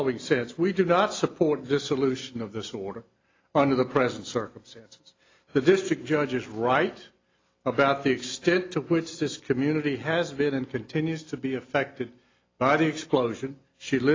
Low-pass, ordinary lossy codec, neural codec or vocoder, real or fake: 7.2 kHz; AAC, 32 kbps; none; real